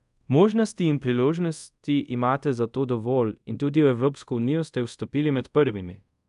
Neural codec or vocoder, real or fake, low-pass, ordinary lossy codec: codec, 24 kHz, 0.5 kbps, DualCodec; fake; 10.8 kHz; none